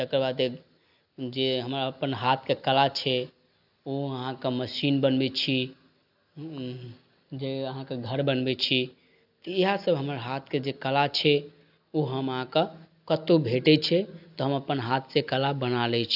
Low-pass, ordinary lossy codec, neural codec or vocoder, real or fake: 5.4 kHz; AAC, 48 kbps; none; real